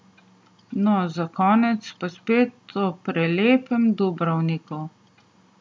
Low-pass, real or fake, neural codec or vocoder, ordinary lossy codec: none; real; none; none